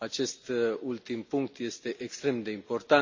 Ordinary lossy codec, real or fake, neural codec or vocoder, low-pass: AAC, 48 kbps; real; none; 7.2 kHz